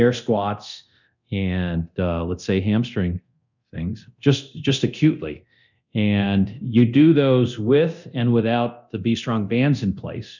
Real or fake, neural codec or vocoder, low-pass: fake; codec, 24 kHz, 0.9 kbps, DualCodec; 7.2 kHz